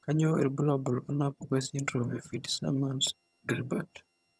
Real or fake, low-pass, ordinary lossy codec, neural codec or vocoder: fake; none; none; vocoder, 22.05 kHz, 80 mel bands, HiFi-GAN